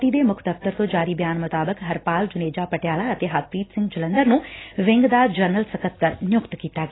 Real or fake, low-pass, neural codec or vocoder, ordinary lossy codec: real; 7.2 kHz; none; AAC, 16 kbps